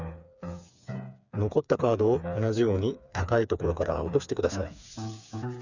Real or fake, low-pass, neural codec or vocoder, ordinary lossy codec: fake; 7.2 kHz; codec, 16 kHz, 4 kbps, FreqCodec, smaller model; none